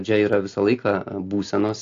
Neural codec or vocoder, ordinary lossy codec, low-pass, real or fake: none; AAC, 48 kbps; 7.2 kHz; real